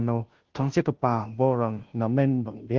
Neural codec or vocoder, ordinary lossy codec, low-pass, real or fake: codec, 16 kHz, 0.5 kbps, FunCodec, trained on Chinese and English, 25 frames a second; Opus, 16 kbps; 7.2 kHz; fake